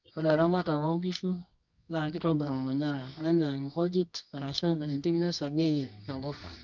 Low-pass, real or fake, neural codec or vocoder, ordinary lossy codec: 7.2 kHz; fake; codec, 24 kHz, 0.9 kbps, WavTokenizer, medium music audio release; none